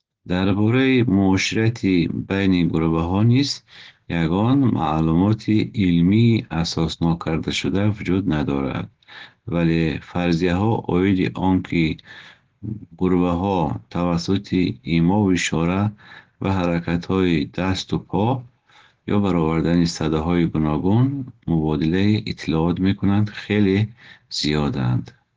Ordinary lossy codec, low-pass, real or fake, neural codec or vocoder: Opus, 16 kbps; 7.2 kHz; real; none